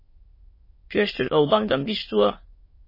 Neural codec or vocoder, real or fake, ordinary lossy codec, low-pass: autoencoder, 22.05 kHz, a latent of 192 numbers a frame, VITS, trained on many speakers; fake; MP3, 24 kbps; 5.4 kHz